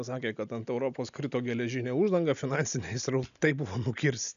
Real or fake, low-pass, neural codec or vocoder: real; 7.2 kHz; none